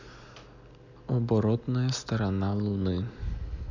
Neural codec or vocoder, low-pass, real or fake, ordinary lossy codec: none; 7.2 kHz; real; none